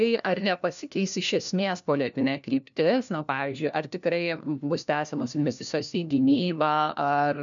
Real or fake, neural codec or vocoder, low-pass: fake; codec, 16 kHz, 1 kbps, FunCodec, trained on LibriTTS, 50 frames a second; 7.2 kHz